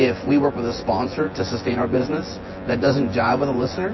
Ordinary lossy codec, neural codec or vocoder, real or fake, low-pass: MP3, 24 kbps; vocoder, 24 kHz, 100 mel bands, Vocos; fake; 7.2 kHz